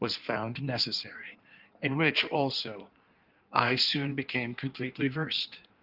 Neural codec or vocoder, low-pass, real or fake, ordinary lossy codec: codec, 16 kHz in and 24 kHz out, 1.1 kbps, FireRedTTS-2 codec; 5.4 kHz; fake; Opus, 32 kbps